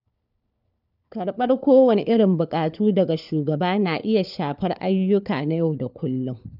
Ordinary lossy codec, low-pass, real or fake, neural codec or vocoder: none; 5.4 kHz; fake; codec, 16 kHz, 4 kbps, FunCodec, trained on LibriTTS, 50 frames a second